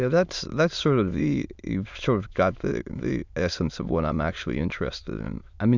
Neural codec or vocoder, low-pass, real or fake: autoencoder, 22.05 kHz, a latent of 192 numbers a frame, VITS, trained on many speakers; 7.2 kHz; fake